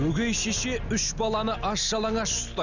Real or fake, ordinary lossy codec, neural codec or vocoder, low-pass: real; none; none; 7.2 kHz